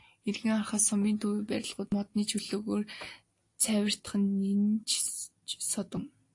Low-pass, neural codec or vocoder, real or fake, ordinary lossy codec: 10.8 kHz; none; real; AAC, 48 kbps